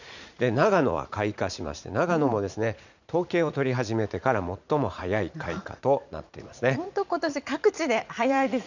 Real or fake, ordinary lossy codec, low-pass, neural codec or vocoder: fake; none; 7.2 kHz; vocoder, 44.1 kHz, 80 mel bands, Vocos